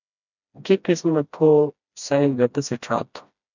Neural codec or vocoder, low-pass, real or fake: codec, 16 kHz, 1 kbps, FreqCodec, smaller model; 7.2 kHz; fake